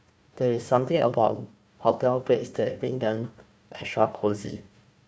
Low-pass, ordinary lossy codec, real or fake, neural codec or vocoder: none; none; fake; codec, 16 kHz, 1 kbps, FunCodec, trained on Chinese and English, 50 frames a second